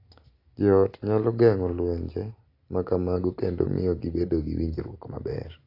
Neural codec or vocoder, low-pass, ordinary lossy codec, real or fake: none; 5.4 kHz; AAC, 32 kbps; real